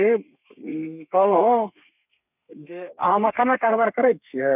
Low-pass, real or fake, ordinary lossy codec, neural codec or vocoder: 3.6 kHz; fake; none; codec, 32 kHz, 1.9 kbps, SNAC